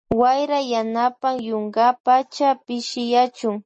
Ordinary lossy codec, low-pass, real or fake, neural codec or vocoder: MP3, 32 kbps; 10.8 kHz; real; none